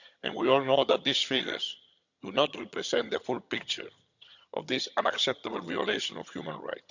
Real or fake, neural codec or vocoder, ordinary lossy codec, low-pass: fake; vocoder, 22.05 kHz, 80 mel bands, HiFi-GAN; none; 7.2 kHz